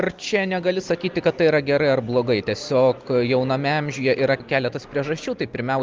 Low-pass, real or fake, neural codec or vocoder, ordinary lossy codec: 7.2 kHz; real; none; Opus, 24 kbps